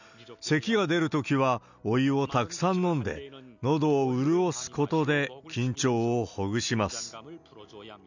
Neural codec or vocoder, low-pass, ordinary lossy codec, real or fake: none; 7.2 kHz; none; real